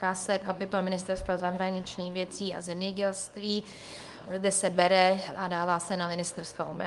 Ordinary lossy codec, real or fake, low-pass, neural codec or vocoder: Opus, 32 kbps; fake; 10.8 kHz; codec, 24 kHz, 0.9 kbps, WavTokenizer, small release